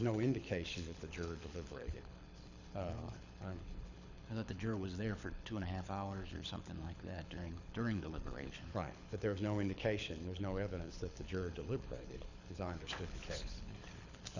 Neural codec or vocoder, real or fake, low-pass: codec, 24 kHz, 6 kbps, HILCodec; fake; 7.2 kHz